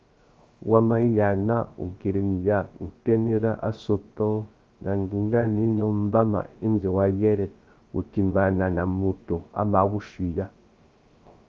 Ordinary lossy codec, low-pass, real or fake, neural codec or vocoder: Opus, 32 kbps; 7.2 kHz; fake; codec, 16 kHz, 0.3 kbps, FocalCodec